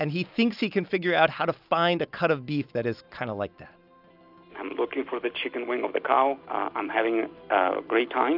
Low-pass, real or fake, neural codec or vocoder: 5.4 kHz; real; none